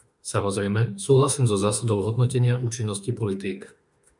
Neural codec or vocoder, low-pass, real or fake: autoencoder, 48 kHz, 32 numbers a frame, DAC-VAE, trained on Japanese speech; 10.8 kHz; fake